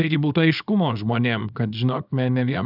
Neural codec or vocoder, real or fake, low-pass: codec, 24 kHz, 0.9 kbps, WavTokenizer, small release; fake; 5.4 kHz